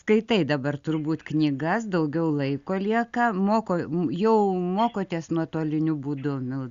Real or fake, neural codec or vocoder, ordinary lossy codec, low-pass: real; none; Opus, 64 kbps; 7.2 kHz